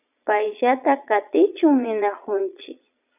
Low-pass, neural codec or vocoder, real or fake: 3.6 kHz; vocoder, 22.05 kHz, 80 mel bands, Vocos; fake